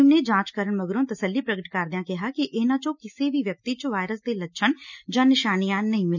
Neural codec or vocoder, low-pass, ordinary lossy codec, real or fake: none; 7.2 kHz; none; real